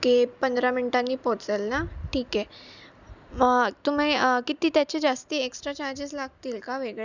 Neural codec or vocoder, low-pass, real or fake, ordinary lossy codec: none; 7.2 kHz; real; none